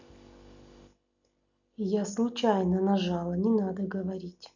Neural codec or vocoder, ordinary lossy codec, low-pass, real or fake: none; none; 7.2 kHz; real